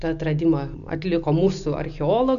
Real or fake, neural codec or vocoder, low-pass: real; none; 7.2 kHz